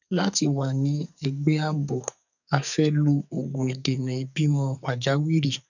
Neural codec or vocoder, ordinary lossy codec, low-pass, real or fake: codec, 44.1 kHz, 2.6 kbps, SNAC; none; 7.2 kHz; fake